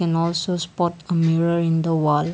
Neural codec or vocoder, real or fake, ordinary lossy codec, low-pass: none; real; none; none